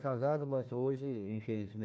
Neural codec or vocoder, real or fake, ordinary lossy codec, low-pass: codec, 16 kHz, 1 kbps, FunCodec, trained on Chinese and English, 50 frames a second; fake; none; none